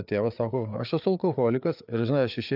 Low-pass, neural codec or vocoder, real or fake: 5.4 kHz; codec, 16 kHz, 4 kbps, FreqCodec, larger model; fake